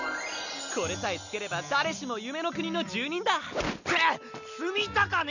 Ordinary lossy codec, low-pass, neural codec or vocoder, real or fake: none; 7.2 kHz; none; real